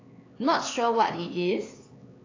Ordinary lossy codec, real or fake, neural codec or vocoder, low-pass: AAC, 32 kbps; fake; codec, 16 kHz, 4 kbps, X-Codec, HuBERT features, trained on LibriSpeech; 7.2 kHz